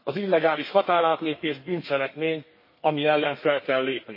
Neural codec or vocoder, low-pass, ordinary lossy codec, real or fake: codec, 32 kHz, 1.9 kbps, SNAC; 5.4 kHz; MP3, 24 kbps; fake